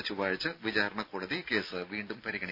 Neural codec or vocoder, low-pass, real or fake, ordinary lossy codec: none; 5.4 kHz; real; AAC, 48 kbps